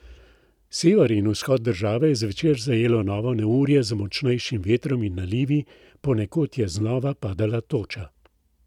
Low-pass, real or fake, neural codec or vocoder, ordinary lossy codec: 19.8 kHz; real; none; none